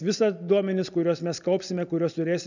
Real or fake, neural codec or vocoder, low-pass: real; none; 7.2 kHz